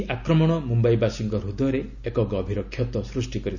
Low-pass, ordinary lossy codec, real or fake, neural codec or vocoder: 7.2 kHz; none; real; none